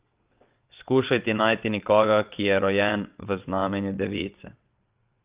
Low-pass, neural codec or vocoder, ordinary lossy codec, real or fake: 3.6 kHz; vocoder, 22.05 kHz, 80 mel bands, WaveNeXt; Opus, 24 kbps; fake